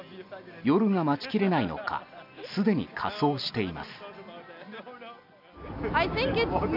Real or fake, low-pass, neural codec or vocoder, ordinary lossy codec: real; 5.4 kHz; none; none